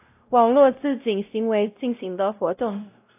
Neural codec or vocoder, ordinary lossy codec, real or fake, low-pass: codec, 16 kHz, 0.5 kbps, X-Codec, WavLM features, trained on Multilingual LibriSpeech; AAC, 32 kbps; fake; 3.6 kHz